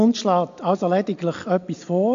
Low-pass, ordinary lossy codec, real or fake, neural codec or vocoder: 7.2 kHz; AAC, 64 kbps; real; none